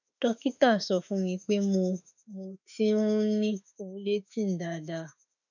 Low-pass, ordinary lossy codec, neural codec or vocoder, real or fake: 7.2 kHz; none; autoencoder, 48 kHz, 32 numbers a frame, DAC-VAE, trained on Japanese speech; fake